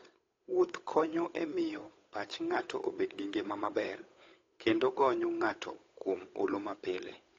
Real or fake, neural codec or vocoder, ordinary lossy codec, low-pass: fake; codec, 16 kHz, 8 kbps, FunCodec, trained on Chinese and English, 25 frames a second; AAC, 32 kbps; 7.2 kHz